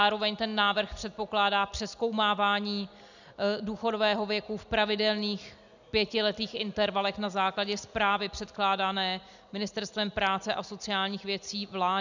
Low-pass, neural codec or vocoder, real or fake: 7.2 kHz; none; real